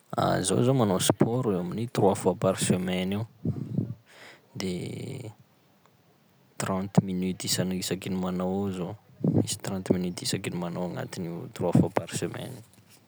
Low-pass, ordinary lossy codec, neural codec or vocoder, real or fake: none; none; none; real